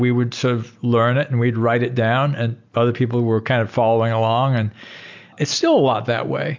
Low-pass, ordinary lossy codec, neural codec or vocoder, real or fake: 7.2 kHz; MP3, 64 kbps; none; real